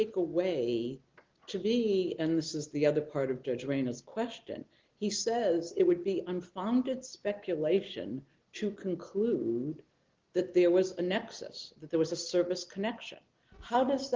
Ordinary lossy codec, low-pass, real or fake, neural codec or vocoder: Opus, 16 kbps; 7.2 kHz; real; none